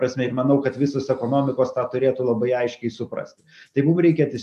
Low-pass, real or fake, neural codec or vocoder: 14.4 kHz; real; none